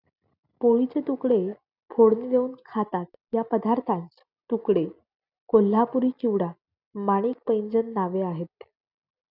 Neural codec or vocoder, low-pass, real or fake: none; 5.4 kHz; real